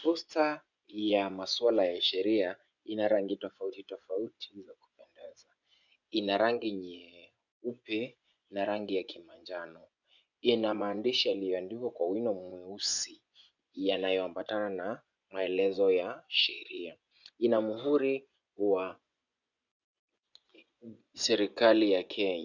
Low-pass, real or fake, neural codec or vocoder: 7.2 kHz; fake; vocoder, 24 kHz, 100 mel bands, Vocos